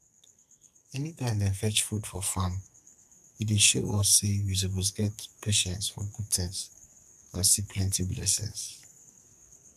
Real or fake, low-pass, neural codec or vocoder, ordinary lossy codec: fake; 14.4 kHz; codec, 44.1 kHz, 2.6 kbps, SNAC; none